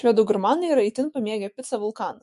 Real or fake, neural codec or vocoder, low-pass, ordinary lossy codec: fake; autoencoder, 48 kHz, 128 numbers a frame, DAC-VAE, trained on Japanese speech; 14.4 kHz; MP3, 48 kbps